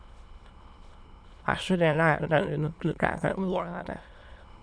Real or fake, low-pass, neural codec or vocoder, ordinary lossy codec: fake; none; autoencoder, 22.05 kHz, a latent of 192 numbers a frame, VITS, trained on many speakers; none